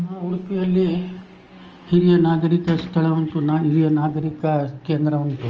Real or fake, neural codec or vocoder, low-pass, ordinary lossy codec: real; none; 7.2 kHz; Opus, 24 kbps